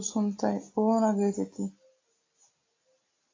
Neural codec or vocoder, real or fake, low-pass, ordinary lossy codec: none; real; 7.2 kHz; AAC, 32 kbps